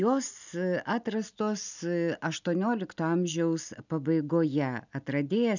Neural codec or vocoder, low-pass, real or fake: none; 7.2 kHz; real